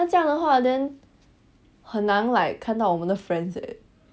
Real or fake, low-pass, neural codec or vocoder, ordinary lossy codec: real; none; none; none